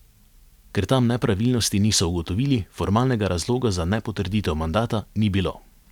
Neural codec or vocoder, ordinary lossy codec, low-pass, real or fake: none; none; 19.8 kHz; real